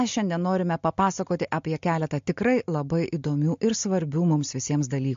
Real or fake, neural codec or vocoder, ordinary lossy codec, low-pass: real; none; MP3, 48 kbps; 7.2 kHz